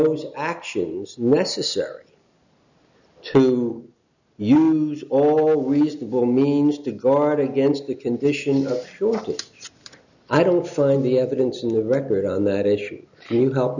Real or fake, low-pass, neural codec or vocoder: real; 7.2 kHz; none